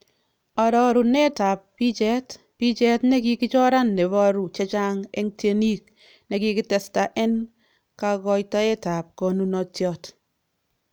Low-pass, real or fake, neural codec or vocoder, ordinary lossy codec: none; real; none; none